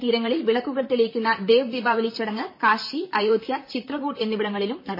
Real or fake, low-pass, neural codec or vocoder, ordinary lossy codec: fake; 5.4 kHz; vocoder, 44.1 kHz, 128 mel bands, Pupu-Vocoder; MP3, 24 kbps